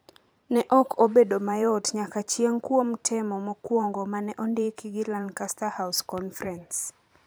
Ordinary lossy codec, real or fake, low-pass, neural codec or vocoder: none; fake; none; vocoder, 44.1 kHz, 128 mel bands every 512 samples, BigVGAN v2